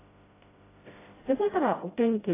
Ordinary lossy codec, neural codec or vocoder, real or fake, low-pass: AAC, 16 kbps; codec, 16 kHz, 0.5 kbps, FreqCodec, smaller model; fake; 3.6 kHz